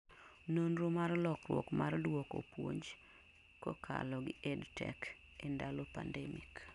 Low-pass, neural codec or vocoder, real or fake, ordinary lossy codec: 10.8 kHz; none; real; none